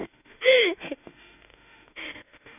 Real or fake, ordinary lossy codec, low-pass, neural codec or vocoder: fake; none; 3.6 kHz; autoencoder, 48 kHz, 32 numbers a frame, DAC-VAE, trained on Japanese speech